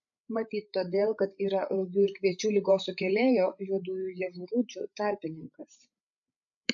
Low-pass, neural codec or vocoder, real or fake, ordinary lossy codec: 7.2 kHz; codec, 16 kHz, 8 kbps, FreqCodec, larger model; fake; AAC, 64 kbps